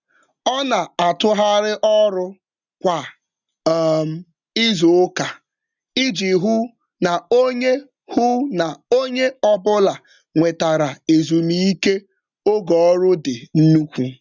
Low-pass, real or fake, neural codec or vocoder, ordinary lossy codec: 7.2 kHz; real; none; none